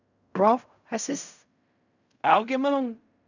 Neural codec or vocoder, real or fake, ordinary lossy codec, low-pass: codec, 16 kHz in and 24 kHz out, 0.4 kbps, LongCat-Audio-Codec, fine tuned four codebook decoder; fake; none; 7.2 kHz